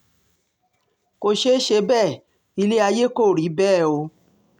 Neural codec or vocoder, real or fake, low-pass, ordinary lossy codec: none; real; 19.8 kHz; none